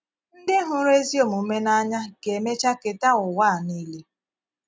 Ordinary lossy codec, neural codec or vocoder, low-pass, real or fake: none; none; none; real